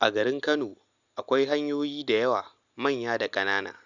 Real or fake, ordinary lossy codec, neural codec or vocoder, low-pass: real; Opus, 64 kbps; none; 7.2 kHz